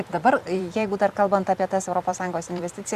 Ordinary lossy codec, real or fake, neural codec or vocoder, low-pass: Opus, 64 kbps; fake; vocoder, 44.1 kHz, 128 mel bands every 256 samples, BigVGAN v2; 14.4 kHz